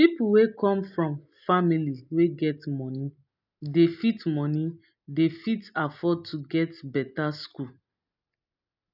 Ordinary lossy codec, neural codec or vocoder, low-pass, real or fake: none; none; 5.4 kHz; real